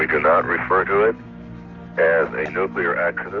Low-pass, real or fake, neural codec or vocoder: 7.2 kHz; real; none